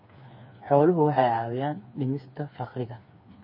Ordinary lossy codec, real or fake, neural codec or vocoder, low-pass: MP3, 24 kbps; fake; codec, 16 kHz, 4 kbps, FreqCodec, smaller model; 5.4 kHz